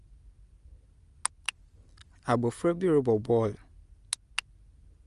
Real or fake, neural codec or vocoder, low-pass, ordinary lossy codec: real; none; 10.8 kHz; Opus, 32 kbps